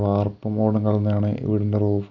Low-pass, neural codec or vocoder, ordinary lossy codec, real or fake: 7.2 kHz; none; none; real